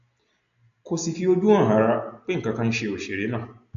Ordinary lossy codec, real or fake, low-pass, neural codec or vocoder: none; real; 7.2 kHz; none